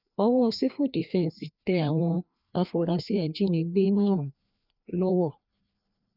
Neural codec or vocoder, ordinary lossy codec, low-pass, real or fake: codec, 16 kHz, 2 kbps, FreqCodec, larger model; none; 5.4 kHz; fake